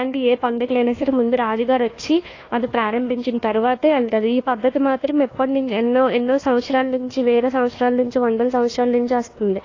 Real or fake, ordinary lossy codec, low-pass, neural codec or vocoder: fake; AAC, 32 kbps; 7.2 kHz; codec, 16 kHz, 1 kbps, FunCodec, trained on Chinese and English, 50 frames a second